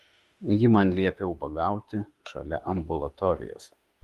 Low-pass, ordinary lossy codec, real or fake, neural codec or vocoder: 14.4 kHz; Opus, 32 kbps; fake; autoencoder, 48 kHz, 32 numbers a frame, DAC-VAE, trained on Japanese speech